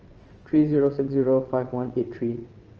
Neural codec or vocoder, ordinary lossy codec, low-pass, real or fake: vocoder, 22.05 kHz, 80 mel bands, WaveNeXt; Opus, 24 kbps; 7.2 kHz; fake